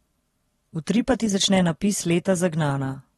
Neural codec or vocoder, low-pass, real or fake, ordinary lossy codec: vocoder, 44.1 kHz, 128 mel bands every 512 samples, BigVGAN v2; 19.8 kHz; fake; AAC, 32 kbps